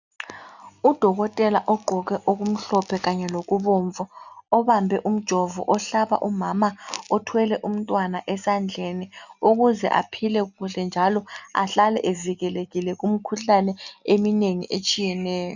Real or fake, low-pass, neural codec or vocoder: real; 7.2 kHz; none